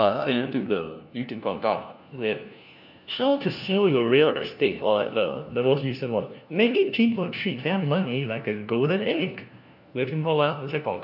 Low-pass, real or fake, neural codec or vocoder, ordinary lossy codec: 5.4 kHz; fake; codec, 16 kHz, 1 kbps, FunCodec, trained on LibriTTS, 50 frames a second; none